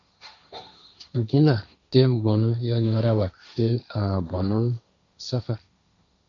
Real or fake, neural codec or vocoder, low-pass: fake; codec, 16 kHz, 1.1 kbps, Voila-Tokenizer; 7.2 kHz